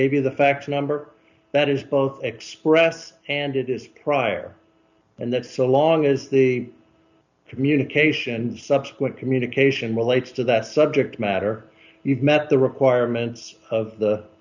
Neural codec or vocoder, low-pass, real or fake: none; 7.2 kHz; real